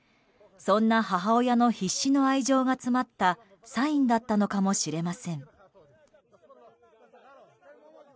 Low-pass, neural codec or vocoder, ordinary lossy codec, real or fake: none; none; none; real